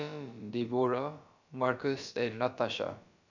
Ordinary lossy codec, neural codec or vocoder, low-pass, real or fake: none; codec, 16 kHz, about 1 kbps, DyCAST, with the encoder's durations; 7.2 kHz; fake